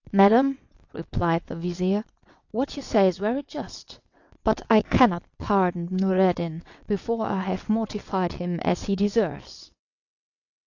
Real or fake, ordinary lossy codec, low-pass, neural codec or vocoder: fake; AAC, 48 kbps; 7.2 kHz; vocoder, 22.05 kHz, 80 mel bands, WaveNeXt